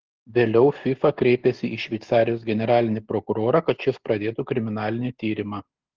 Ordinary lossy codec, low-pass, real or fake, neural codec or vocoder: Opus, 16 kbps; 7.2 kHz; real; none